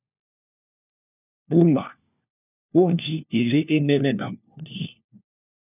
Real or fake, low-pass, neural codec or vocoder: fake; 3.6 kHz; codec, 16 kHz, 1 kbps, FunCodec, trained on LibriTTS, 50 frames a second